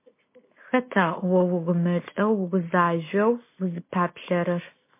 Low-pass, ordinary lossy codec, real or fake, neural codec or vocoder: 3.6 kHz; MP3, 24 kbps; real; none